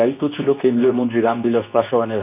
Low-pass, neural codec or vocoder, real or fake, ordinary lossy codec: 3.6 kHz; codec, 24 kHz, 0.9 kbps, WavTokenizer, medium speech release version 2; fake; none